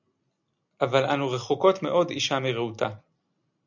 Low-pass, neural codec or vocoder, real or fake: 7.2 kHz; none; real